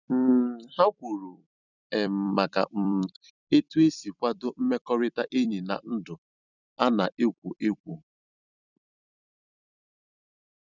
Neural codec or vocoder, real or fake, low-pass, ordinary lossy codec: vocoder, 44.1 kHz, 128 mel bands every 256 samples, BigVGAN v2; fake; 7.2 kHz; none